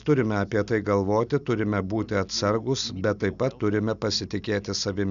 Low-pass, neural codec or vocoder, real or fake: 7.2 kHz; none; real